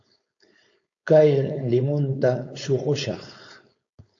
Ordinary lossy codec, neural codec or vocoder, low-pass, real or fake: AAC, 48 kbps; codec, 16 kHz, 4.8 kbps, FACodec; 7.2 kHz; fake